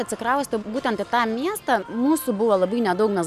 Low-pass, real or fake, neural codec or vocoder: 14.4 kHz; real; none